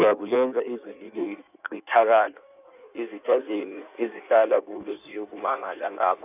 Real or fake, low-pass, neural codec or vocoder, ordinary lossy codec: fake; 3.6 kHz; codec, 16 kHz in and 24 kHz out, 1.1 kbps, FireRedTTS-2 codec; none